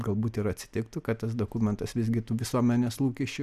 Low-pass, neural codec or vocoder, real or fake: 14.4 kHz; none; real